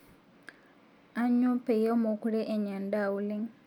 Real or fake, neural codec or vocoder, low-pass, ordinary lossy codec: real; none; 19.8 kHz; none